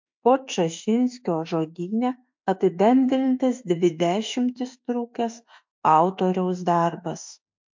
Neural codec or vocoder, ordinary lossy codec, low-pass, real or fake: autoencoder, 48 kHz, 32 numbers a frame, DAC-VAE, trained on Japanese speech; MP3, 48 kbps; 7.2 kHz; fake